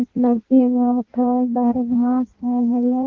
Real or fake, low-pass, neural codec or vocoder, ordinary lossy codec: fake; 7.2 kHz; codec, 16 kHz in and 24 kHz out, 0.6 kbps, FireRedTTS-2 codec; Opus, 16 kbps